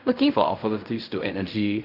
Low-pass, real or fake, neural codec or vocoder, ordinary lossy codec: 5.4 kHz; fake; codec, 16 kHz in and 24 kHz out, 0.4 kbps, LongCat-Audio-Codec, fine tuned four codebook decoder; none